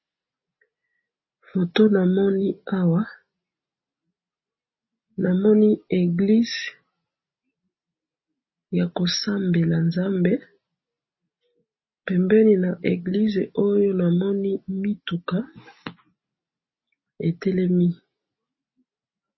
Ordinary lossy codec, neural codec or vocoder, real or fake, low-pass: MP3, 24 kbps; none; real; 7.2 kHz